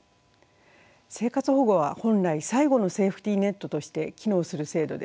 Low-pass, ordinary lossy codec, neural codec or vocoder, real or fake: none; none; none; real